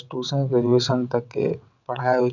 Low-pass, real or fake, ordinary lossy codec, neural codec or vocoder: 7.2 kHz; fake; none; vocoder, 22.05 kHz, 80 mel bands, WaveNeXt